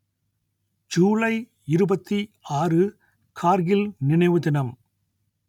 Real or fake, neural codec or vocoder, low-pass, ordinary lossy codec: real; none; 19.8 kHz; none